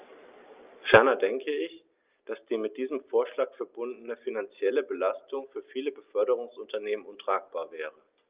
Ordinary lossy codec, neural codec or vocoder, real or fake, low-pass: Opus, 24 kbps; none; real; 3.6 kHz